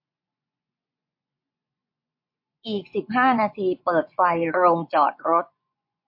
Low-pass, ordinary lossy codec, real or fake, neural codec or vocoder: 5.4 kHz; MP3, 32 kbps; fake; vocoder, 24 kHz, 100 mel bands, Vocos